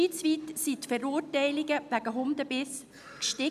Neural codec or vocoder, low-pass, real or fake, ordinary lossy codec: none; 14.4 kHz; real; none